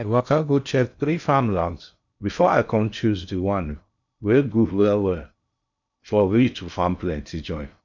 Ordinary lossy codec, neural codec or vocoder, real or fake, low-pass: none; codec, 16 kHz in and 24 kHz out, 0.6 kbps, FocalCodec, streaming, 2048 codes; fake; 7.2 kHz